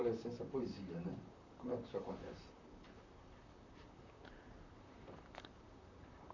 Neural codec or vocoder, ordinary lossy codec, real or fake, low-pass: vocoder, 44.1 kHz, 128 mel bands, Pupu-Vocoder; none; fake; 7.2 kHz